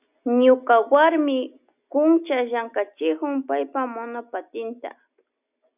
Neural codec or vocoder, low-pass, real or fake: none; 3.6 kHz; real